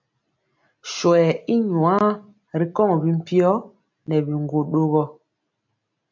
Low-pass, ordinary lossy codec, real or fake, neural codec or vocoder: 7.2 kHz; MP3, 48 kbps; real; none